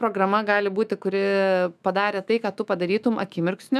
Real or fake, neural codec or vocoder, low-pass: fake; autoencoder, 48 kHz, 128 numbers a frame, DAC-VAE, trained on Japanese speech; 14.4 kHz